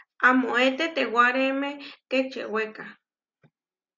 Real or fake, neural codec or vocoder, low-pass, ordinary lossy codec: real; none; 7.2 kHz; Opus, 64 kbps